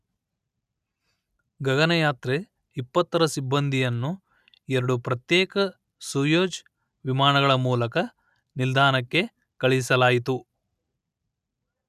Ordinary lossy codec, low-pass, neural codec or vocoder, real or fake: none; 14.4 kHz; none; real